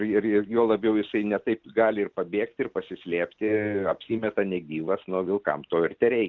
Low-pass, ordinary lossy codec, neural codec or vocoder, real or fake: 7.2 kHz; Opus, 24 kbps; none; real